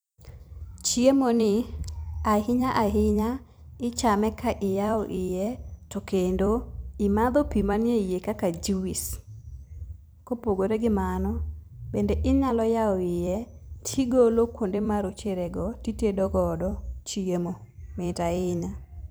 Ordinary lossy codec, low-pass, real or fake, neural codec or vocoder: none; none; fake; vocoder, 44.1 kHz, 128 mel bands every 256 samples, BigVGAN v2